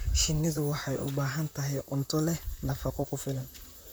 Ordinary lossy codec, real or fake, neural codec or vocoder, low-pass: none; fake; vocoder, 44.1 kHz, 128 mel bands, Pupu-Vocoder; none